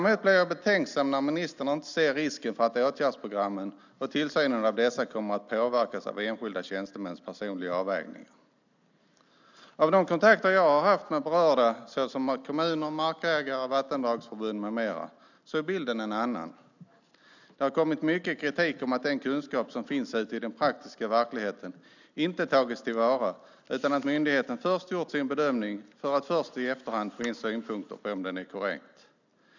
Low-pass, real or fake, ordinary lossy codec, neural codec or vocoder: 7.2 kHz; real; none; none